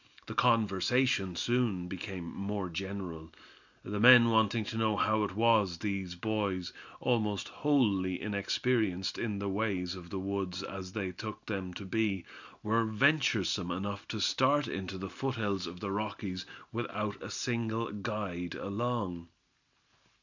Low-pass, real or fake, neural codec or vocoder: 7.2 kHz; real; none